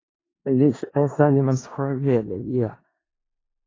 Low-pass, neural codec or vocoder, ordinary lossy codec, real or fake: 7.2 kHz; codec, 16 kHz in and 24 kHz out, 0.4 kbps, LongCat-Audio-Codec, four codebook decoder; AAC, 32 kbps; fake